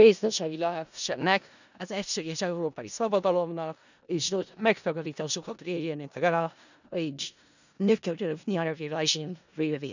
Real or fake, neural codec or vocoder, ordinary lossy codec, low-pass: fake; codec, 16 kHz in and 24 kHz out, 0.4 kbps, LongCat-Audio-Codec, four codebook decoder; none; 7.2 kHz